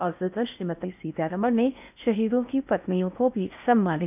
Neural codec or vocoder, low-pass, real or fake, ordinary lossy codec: codec, 16 kHz in and 24 kHz out, 0.6 kbps, FocalCodec, streaming, 4096 codes; 3.6 kHz; fake; none